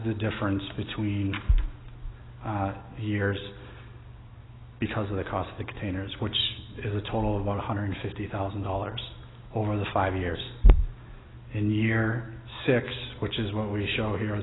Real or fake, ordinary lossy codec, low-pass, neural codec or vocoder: real; AAC, 16 kbps; 7.2 kHz; none